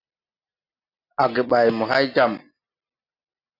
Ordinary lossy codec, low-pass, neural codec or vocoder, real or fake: AAC, 32 kbps; 5.4 kHz; none; real